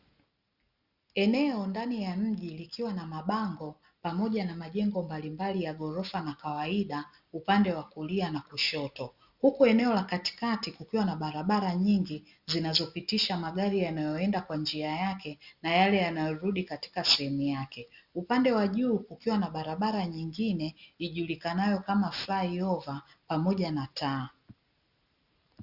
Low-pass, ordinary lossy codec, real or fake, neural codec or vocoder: 5.4 kHz; Opus, 64 kbps; real; none